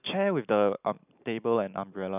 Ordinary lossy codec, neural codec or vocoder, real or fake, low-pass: none; none; real; 3.6 kHz